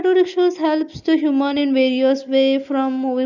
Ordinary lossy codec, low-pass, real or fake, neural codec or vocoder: none; 7.2 kHz; real; none